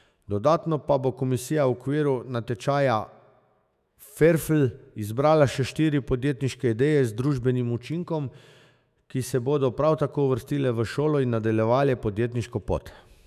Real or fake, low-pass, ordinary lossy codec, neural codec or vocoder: fake; 14.4 kHz; none; autoencoder, 48 kHz, 128 numbers a frame, DAC-VAE, trained on Japanese speech